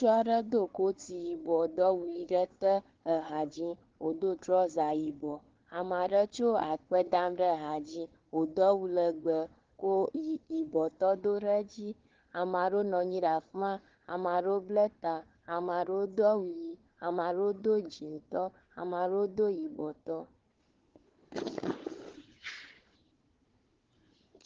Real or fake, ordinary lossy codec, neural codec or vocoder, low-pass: fake; Opus, 16 kbps; codec, 16 kHz, 4 kbps, FunCodec, trained on Chinese and English, 50 frames a second; 7.2 kHz